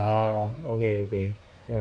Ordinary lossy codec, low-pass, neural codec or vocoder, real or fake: none; 9.9 kHz; codec, 24 kHz, 1 kbps, SNAC; fake